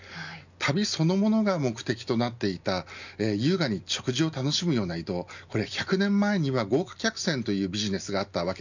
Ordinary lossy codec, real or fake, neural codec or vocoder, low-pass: none; real; none; 7.2 kHz